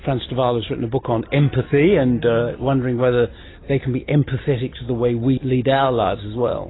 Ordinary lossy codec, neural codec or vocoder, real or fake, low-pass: AAC, 16 kbps; none; real; 7.2 kHz